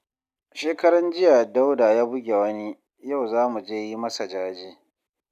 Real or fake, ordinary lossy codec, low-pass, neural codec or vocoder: real; none; 14.4 kHz; none